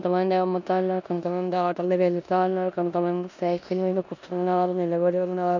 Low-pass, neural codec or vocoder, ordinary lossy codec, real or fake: 7.2 kHz; codec, 16 kHz in and 24 kHz out, 0.9 kbps, LongCat-Audio-Codec, four codebook decoder; none; fake